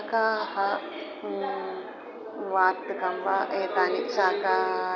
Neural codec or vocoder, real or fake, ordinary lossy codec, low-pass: none; real; none; 7.2 kHz